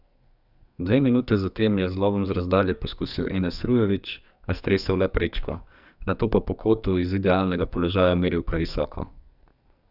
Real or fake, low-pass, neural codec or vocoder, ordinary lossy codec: fake; 5.4 kHz; codec, 44.1 kHz, 2.6 kbps, SNAC; none